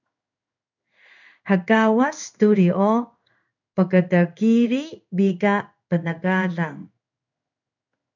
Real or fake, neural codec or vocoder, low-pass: fake; codec, 16 kHz in and 24 kHz out, 1 kbps, XY-Tokenizer; 7.2 kHz